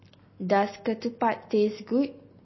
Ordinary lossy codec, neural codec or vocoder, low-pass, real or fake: MP3, 24 kbps; none; 7.2 kHz; real